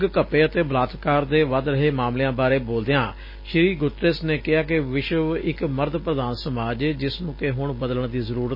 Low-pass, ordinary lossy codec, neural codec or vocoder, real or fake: 5.4 kHz; MP3, 24 kbps; none; real